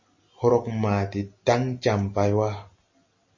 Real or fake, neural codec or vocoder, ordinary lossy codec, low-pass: real; none; MP3, 32 kbps; 7.2 kHz